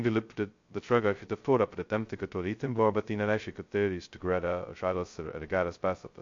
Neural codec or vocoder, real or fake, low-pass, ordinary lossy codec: codec, 16 kHz, 0.2 kbps, FocalCodec; fake; 7.2 kHz; MP3, 48 kbps